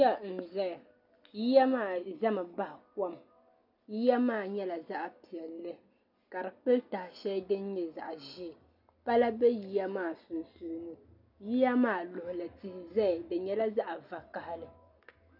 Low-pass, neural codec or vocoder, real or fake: 5.4 kHz; none; real